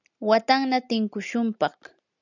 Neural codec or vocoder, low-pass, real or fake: none; 7.2 kHz; real